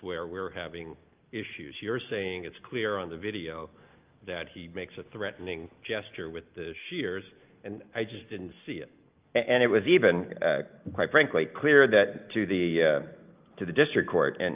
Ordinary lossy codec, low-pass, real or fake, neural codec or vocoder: Opus, 24 kbps; 3.6 kHz; real; none